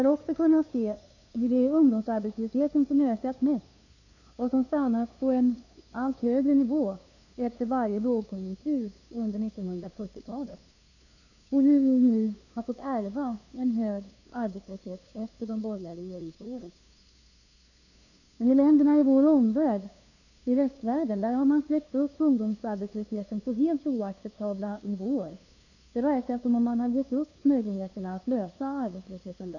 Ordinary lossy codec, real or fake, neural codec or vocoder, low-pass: none; fake; codec, 16 kHz, 2 kbps, FunCodec, trained on LibriTTS, 25 frames a second; 7.2 kHz